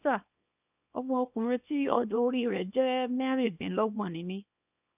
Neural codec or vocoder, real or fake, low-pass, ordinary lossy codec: codec, 24 kHz, 0.9 kbps, WavTokenizer, small release; fake; 3.6 kHz; none